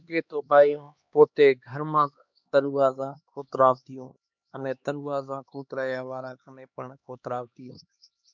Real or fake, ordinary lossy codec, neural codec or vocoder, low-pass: fake; MP3, 64 kbps; codec, 16 kHz, 2 kbps, X-Codec, HuBERT features, trained on LibriSpeech; 7.2 kHz